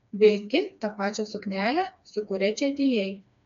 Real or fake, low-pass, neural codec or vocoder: fake; 7.2 kHz; codec, 16 kHz, 2 kbps, FreqCodec, smaller model